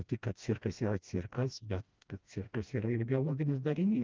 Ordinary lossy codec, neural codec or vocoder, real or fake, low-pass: Opus, 24 kbps; codec, 16 kHz, 1 kbps, FreqCodec, smaller model; fake; 7.2 kHz